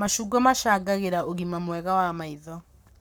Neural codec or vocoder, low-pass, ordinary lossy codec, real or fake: codec, 44.1 kHz, 7.8 kbps, Pupu-Codec; none; none; fake